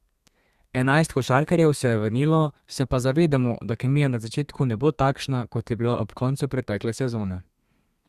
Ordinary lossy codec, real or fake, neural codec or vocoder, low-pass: Opus, 64 kbps; fake; codec, 32 kHz, 1.9 kbps, SNAC; 14.4 kHz